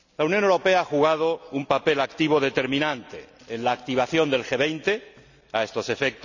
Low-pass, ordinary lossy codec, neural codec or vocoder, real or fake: 7.2 kHz; none; none; real